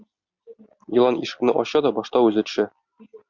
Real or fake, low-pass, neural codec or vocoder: real; 7.2 kHz; none